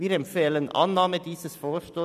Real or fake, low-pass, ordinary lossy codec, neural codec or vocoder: fake; 14.4 kHz; none; vocoder, 44.1 kHz, 128 mel bands every 256 samples, BigVGAN v2